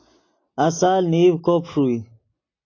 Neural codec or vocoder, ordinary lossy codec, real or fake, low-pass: none; AAC, 32 kbps; real; 7.2 kHz